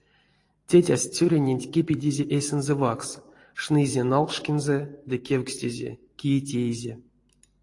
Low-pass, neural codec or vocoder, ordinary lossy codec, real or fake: 10.8 kHz; vocoder, 44.1 kHz, 128 mel bands every 512 samples, BigVGAN v2; AAC, 48 kbps; fake